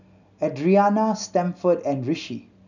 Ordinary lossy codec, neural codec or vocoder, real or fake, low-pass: none; none; real; 7.2 kHz